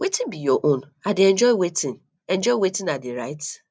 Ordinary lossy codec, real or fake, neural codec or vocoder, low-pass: none; real; none; none